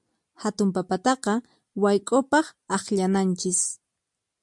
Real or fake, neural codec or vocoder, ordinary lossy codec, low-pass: real; none; MP3, 64 kbps; 10.8 kHz